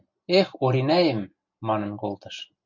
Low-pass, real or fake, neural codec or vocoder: 7.2 kHz; real; none